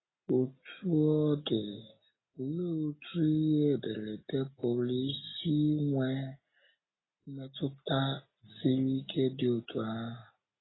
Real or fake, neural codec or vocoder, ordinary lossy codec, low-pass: real; none; AAC, 16 kbps; 7.2 kHz